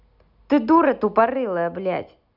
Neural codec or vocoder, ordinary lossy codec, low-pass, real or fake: none; none; 5.4 kHz; real